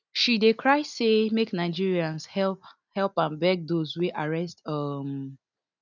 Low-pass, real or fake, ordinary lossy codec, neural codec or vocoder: 7.2 kHz; real; none; none